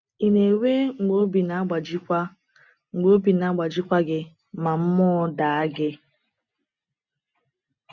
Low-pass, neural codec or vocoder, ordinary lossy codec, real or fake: 7.2 kHz; none; none; real